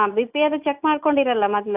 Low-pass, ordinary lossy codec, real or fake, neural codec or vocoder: 3.6 kHz; AAC, 32 kbps; real; none